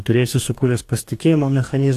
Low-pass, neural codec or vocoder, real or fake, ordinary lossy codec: 14.4 kHz; codec, 44.1 kHz, 2.6 kbps, DAC; fake; AAC, 64 kbps